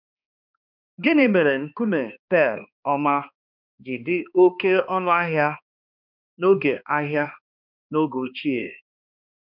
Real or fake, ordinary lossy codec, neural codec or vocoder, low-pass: fake; none; codec, 16 kHz, 2 kbps, X-Codec, HuBERT features, trained on balanced general audio; 5.4 kHz